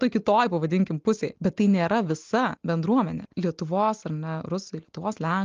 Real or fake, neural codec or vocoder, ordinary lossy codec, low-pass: real; none; Opus, 32 kbps; 7.2 kHz